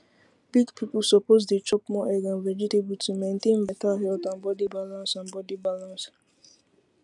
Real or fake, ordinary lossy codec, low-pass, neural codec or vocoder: real; none; 10.8 kHz; none